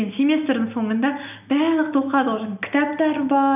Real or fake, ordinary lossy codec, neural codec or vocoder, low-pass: real; none; none; 3.6 kHz